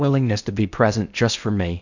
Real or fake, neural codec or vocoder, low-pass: fake; codec, 16 kHz in and 24 kHz out, 0.6 kbps, FocalCodec, streaming, 4096 codes; 7.2 kHz